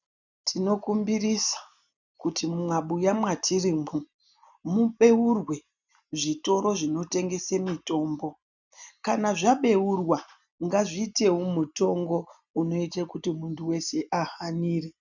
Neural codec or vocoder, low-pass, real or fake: none; 7.2 kHz; real